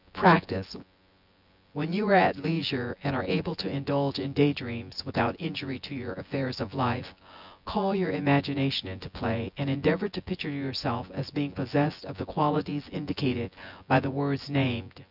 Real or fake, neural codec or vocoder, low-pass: fake; vocoder, 24 kHz, 100 mel bands, Vocos; 5.4 kHz